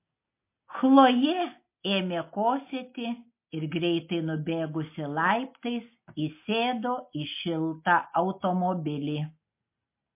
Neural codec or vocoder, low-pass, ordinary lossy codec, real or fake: none; 3.6 kHz; MP3, 24 kbps; real